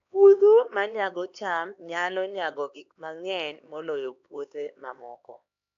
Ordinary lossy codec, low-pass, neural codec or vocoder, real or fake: AAC, 64 kbps; 7.2 kHz; codec, 16 kHz, 2 kbps, X-Codec, HuBERT features, trained on LibriSpeech; fake